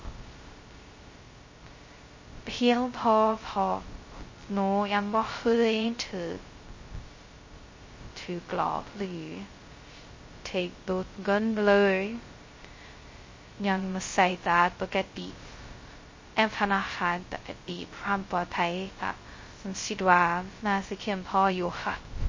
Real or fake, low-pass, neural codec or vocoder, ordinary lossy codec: fake; 7.2 kHz; codec, 16 kHz, 0.2 kbps, FocalCodec; MP3, 32 kbps